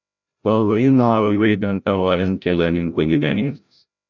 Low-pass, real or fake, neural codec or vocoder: 7.2 kHz; fake; codec, 16 kHz, 0.5 kbps, FreqCodec, larger model